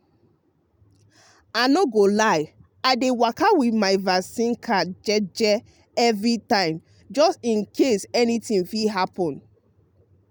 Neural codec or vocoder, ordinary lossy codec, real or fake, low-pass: none; none; real; none